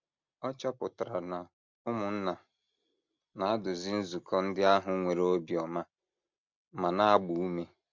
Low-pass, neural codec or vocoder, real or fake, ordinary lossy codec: 7.2 kHz; none; real; MP3, 64 kbps